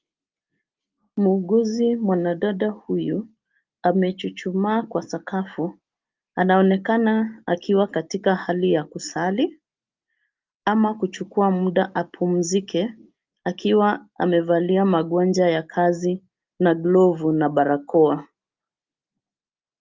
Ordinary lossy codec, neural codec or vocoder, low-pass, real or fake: Opus, 24 kbps; none; 7.2 kHz; real